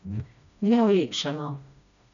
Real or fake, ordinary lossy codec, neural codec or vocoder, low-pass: fake; none; codec, 16 kHz, 1 kbps, FreqCodec, smaller model; 7.2 kHz